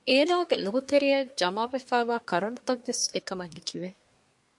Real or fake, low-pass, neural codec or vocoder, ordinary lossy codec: fake; 10.8 kHz; codec, 24 kHz, 1 kbps, SNAC; MP3, 64 kbps